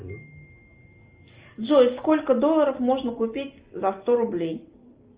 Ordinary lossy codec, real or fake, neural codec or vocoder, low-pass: Opus, 24 kbps; real; none; 3.6 kHz